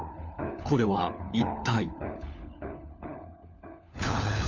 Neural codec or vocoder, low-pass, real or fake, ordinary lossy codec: codec, 16 kHz, 4 kbps, FunCodec, trained on LibriTTS, 50 frames a second; 7.2 kHz; fake; none